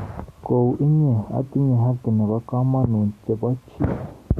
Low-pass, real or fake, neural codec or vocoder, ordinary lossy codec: 14.4 kHz; real; none; Opus, 64 kbps